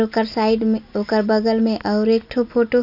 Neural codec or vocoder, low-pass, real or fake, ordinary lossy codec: none; 5.4 kHz; real; none